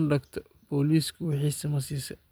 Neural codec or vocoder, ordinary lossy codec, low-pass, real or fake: vocoder, 44.1 kHz, 128 mel bands every 256 samples, BigVGAN v2; none; none; fake